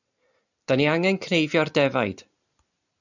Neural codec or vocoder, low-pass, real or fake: none; 7.2 kHz; real